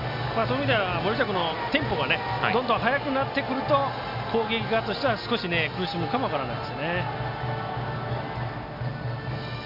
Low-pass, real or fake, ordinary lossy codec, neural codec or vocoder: 5.4 kHz; real; none; none